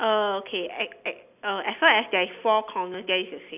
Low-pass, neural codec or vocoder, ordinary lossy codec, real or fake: 3.6 kHz; none; none; real